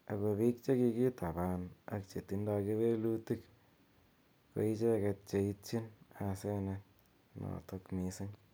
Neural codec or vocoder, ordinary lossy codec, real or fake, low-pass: none; none; real; none